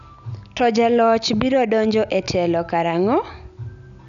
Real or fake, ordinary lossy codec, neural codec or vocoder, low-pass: real; none; none; 7.2 kHz